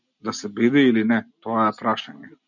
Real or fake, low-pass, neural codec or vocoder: real; 7.2 kHz; none